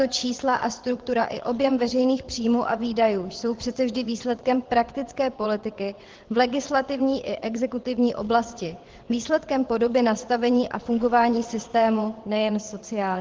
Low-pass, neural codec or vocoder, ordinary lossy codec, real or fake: 7.2 kHz; vocoder, 22.05 kHz, 80 mel bands, WaveNeXt; Opus, 16 kbps; fake